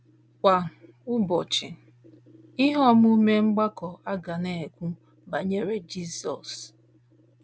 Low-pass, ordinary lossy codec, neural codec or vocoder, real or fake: none; none; none; real